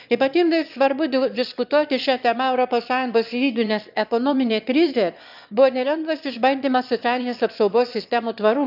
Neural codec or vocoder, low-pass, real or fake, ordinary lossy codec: autoencoder, 22.05 kHz, a latent of 192 numbers a frame, VITS, trained on one speaker; 5.4 kHz; fake; none